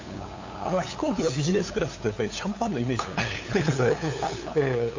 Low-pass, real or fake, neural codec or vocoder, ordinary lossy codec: 7.2 kHz; fake; codec, 16 kHz, 8 kbps, FunCodec, trained on LibriTTS, 25 frames a second; AAC, 48 kbps